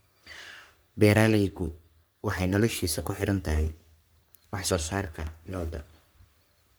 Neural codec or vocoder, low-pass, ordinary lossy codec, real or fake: codec, 44.1 kHz, 3.4 kbps, Pupu-Codec; none; none; fake